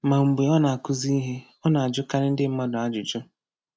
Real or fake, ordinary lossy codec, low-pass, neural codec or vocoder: real; none; none; none